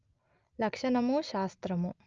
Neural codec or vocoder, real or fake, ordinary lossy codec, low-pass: none; real; Opus, 24 kbps; 7.2 kHz